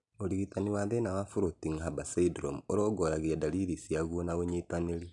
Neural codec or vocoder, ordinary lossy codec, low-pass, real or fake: none; none; 10.8 kHz; real